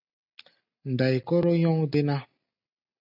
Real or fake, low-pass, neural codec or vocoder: real; 5.4 kHz; none